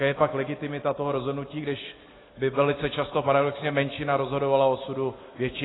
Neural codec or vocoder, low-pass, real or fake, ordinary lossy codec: none; 7.2 kHz; real; AAC, 16 kbps